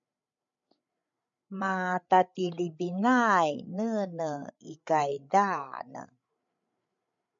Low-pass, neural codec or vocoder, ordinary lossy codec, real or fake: 7.2 kHz; codec, 16 kHz, 8 kbps, FreqCodec, larger model; MP3, 64 kbps; fake